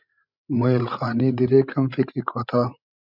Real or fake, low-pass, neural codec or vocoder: fake; 5.4 kHz; codec, 16 kHz, 16 kbps, FreqCodec, larger model